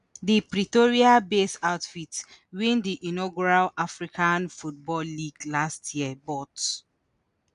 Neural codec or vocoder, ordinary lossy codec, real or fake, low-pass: none; none; real; 10.8 kHz